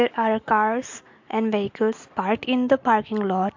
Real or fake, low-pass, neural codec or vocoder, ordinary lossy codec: real; 7.2 kHz; none; MP3, 48 kbps